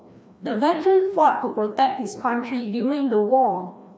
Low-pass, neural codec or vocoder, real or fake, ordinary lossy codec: none; codec, 16 kHz, 1 kbps, FreqCodec, larger model; fake; none